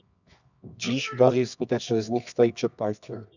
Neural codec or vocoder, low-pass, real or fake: codec, 24 kHz, 0.9 kbps, WavTokenizer, medium music audio release; 7.2 kHz; fake